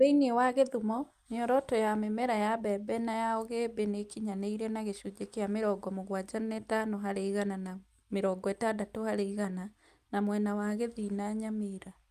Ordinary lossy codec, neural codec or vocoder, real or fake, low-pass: Opus, 32 kbps; none; real; 14.4 kHz